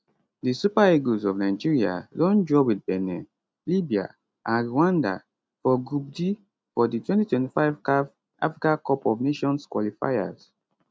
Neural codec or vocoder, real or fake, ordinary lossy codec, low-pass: none; real; none; none